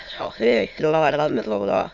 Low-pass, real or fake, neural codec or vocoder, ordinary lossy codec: 7.2 kHz; fake; autoencoder, 22.05 kHz, a latent of 192 numbers a frame, VITS, trained on many speakers; none